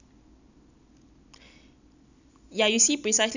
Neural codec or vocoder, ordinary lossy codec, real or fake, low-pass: none; none; real; 7.2 kHz